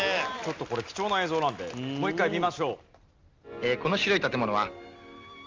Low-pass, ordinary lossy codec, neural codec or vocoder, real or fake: 7.2 kHz; Opus, 32 kbps; none; real